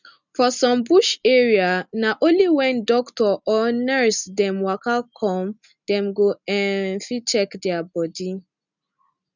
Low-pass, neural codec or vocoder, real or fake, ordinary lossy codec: 7.2 kHz; none; real; none